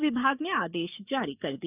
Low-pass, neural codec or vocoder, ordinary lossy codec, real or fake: 3.6 kHz; codec, 44.1 kHz, 7.8 kbps, DAC; none; fake